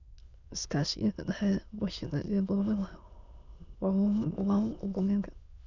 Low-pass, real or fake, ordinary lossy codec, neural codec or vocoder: 7.2 kHz; fake; none; autoencoder, 22.05 kHz, a latent of 192 numbers a frame, VITS, trained on many speakers